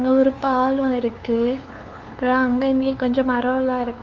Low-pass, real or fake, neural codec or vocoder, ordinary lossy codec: 7.2 kHz; fake; codec, 16 kHz, 2 kbps, FunCodec, trained on LibriTTS, 25 frames a second; Opus, 32 kbps